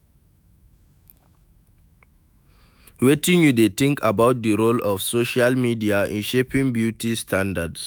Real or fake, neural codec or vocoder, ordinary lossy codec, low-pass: fake; autoencoder, 48 kHz, 128 numbers a frame, DAC-VAE, trained on Japanese speech; none; none